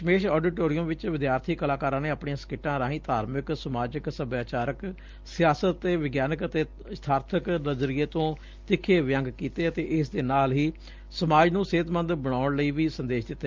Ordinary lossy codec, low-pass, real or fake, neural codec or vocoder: Opus, 24 kbps; 7.2 kHz; real; none